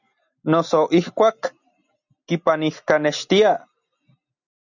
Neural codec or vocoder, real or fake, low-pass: none; real; 7.2 kHz